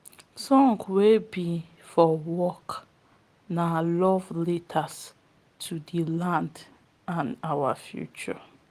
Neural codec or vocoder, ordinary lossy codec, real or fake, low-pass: none; Opus, 24 kbps; real; 14.4 kHz